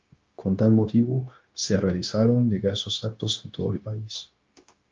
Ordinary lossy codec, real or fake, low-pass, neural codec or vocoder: Opus, 24 kbps; fake; 7.2 kHz; codec, 16 kHz, 0.9 kbps, LongCat-Audio-Codec